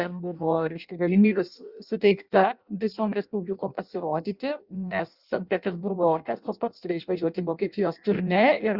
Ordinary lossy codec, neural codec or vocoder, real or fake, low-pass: Opus, 64 kbps; codec, 16 kHz in and 24 kHz out, 0.6 kbps, FireRedTTS-2 codec; fake; 5.4 kHz